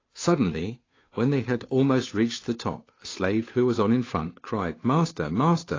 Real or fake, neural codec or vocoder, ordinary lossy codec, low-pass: fake; codec, 16 kHz, 2 kbps, FunCodec, trained on Chinese and English, 25 frames a second; AAC, 32 kbps; 7.2 kHz